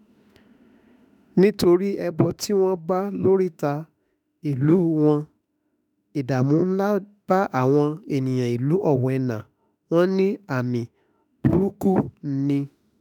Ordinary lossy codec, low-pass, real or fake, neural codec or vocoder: none; 19.8 kHz; fake; autoencoder, 48 kHz, 32 numbers a frame, DAC-VAE, trained on Japanese speech